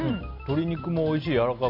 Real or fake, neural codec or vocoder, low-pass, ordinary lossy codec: real; none; 5.4 kHz; none